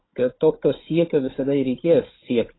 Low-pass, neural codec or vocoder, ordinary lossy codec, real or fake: 7.2 kHz; codec, 16 kHz, 16 kbps, FunCodec, trained on Chinese and English, 50 frames a second; AAC, 16 kbps; fake